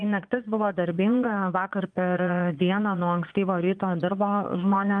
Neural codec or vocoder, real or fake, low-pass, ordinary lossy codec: vocoder, 22.05 kHz, 80 mel bands, Vocos; fake; 9.9 kHz; Opus, 32 kbps